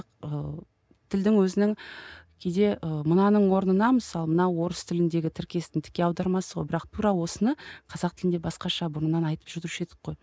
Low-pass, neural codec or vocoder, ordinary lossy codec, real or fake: none; none; none; real